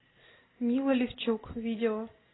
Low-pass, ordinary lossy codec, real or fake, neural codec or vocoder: 7.2 kHz; AAC, 16 kbps; real; none